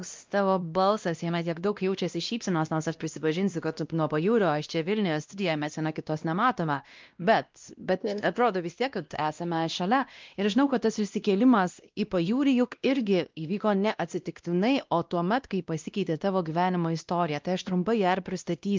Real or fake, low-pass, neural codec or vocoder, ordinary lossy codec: fake; 7.2 kHz; codec, 16 kHz, 1 kbps, X-Codec, WavLM features, trained on Multilingual LibriSpeech; Opus, 24 kbps